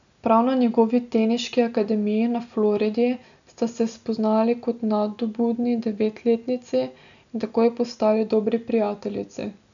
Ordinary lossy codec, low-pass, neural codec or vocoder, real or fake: none; 7.2 kHz; none; real